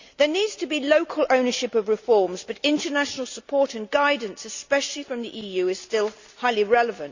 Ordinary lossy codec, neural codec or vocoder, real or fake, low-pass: Opus, 64 kbps; none; real; 7.2 kHz